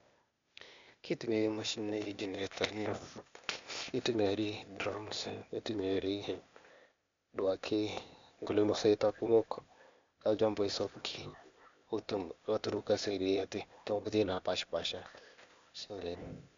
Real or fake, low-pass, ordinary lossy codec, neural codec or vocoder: fake; 7.2 kHz; MP3, 64 kbps; codec, 16 kHz, 0.8 kbps, ZipCodec